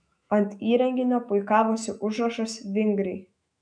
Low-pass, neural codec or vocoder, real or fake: 9.9 kHz; autoencoder, 48 kHz, 128 numbers a frame, DAC-VAE, trained on Japanese speech; fake